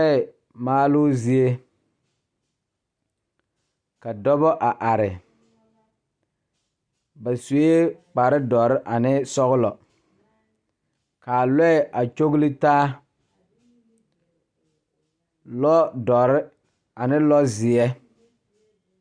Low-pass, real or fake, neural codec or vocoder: 9.9 kHz; real; none